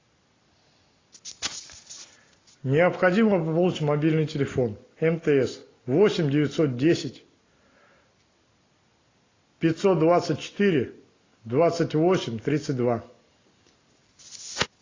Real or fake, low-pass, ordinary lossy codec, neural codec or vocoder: real; 7.2 kHz; AAC, 32 kbps; none